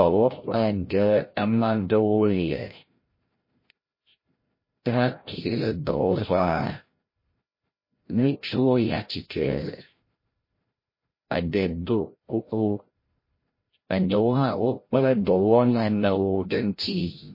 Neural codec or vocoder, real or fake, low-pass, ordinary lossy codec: codec, 16 kHz, 0.5 kbps, FreqCodec, larger model; fake; 5.4 kHz; MP3, 24 kbps